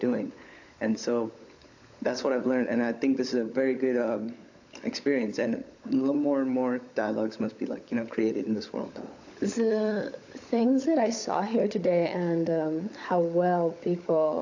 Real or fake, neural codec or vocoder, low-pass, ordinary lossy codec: fake; codec, 16 kHz, 16 kbps, FunCodec, trained on LibriTTS, 50 frames a second; 7.2 kHz; AAC, 48 kbps